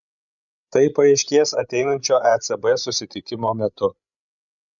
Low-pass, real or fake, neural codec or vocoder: 7.2 kHz; fake; codec, 16 kHz, 16 kbps, FreqCodec, larger model